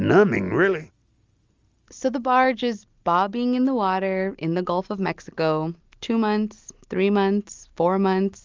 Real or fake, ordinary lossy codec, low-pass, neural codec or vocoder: real; Opus, 32 kbps; 7.2 kHz; none